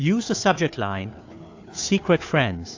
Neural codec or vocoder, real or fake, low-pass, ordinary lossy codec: codec, 16 kHz, 4 kbps, FunCodec, trained on Chinese and English, 50 frames a second; fake; 7.2 kHz; AAC, 48 kbps